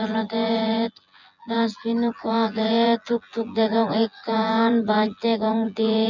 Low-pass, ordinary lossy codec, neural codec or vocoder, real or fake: 7.2 kHz; none; vocoder, 24 kHz, 100 mel bands, Vocos; fake